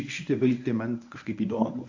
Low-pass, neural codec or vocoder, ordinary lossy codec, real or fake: 7.2 kHz; codec, 24 kHz, 0.9 kbps, WavTokenizer, medium speech release version 1; AAC, 48 kbps; fake